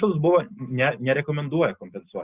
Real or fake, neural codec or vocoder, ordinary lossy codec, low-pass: real; none; Opus, 64 kbps; 3.6 kHz